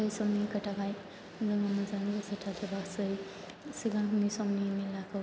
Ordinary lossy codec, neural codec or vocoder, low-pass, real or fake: none; none; none; real